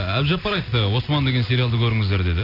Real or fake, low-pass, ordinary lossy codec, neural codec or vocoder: real; 5.4 kHz; none; none